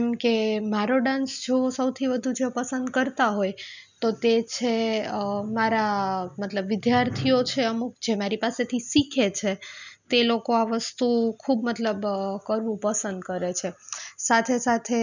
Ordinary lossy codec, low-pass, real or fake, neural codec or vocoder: none; 7.2 kHz; real; none